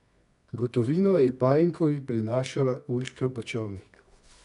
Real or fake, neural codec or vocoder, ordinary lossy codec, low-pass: fake; codec, 24 kHz, 0.9 kbps, WavTokenizer, medium music audio release; none; 10.8 kHz